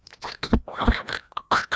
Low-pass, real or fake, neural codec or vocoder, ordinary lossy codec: none; fake; codec, 16 kHz, 1 kbps, FreqCodec, larger model; none